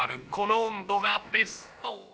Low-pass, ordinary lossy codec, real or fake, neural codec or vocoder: none; none; fake; codec, 16 kHz, about 1 kbps, DyCAST, with the encoder's durations